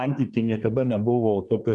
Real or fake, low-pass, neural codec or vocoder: fake; 10.8 kHz; codec, 24 kHz, 1 kbps, SNAC